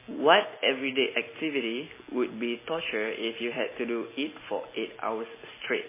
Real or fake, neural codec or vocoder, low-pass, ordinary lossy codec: real; none; 3.6 kHz; MP3, 16 kbps